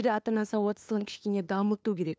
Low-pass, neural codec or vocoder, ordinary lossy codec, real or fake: none; codec, 16 kHz, 2 kbps, FunCodec, trained on LibriTTS, 25 frames a second; none; fake